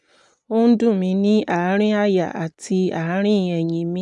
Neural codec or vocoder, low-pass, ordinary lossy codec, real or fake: none; 10.8 kHz; none; real